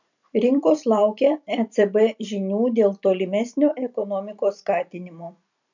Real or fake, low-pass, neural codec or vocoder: fake; 7.2 kHz; vocoder, 44.1 kHz, 128 mel bands every 256 samples, BigVGAN v2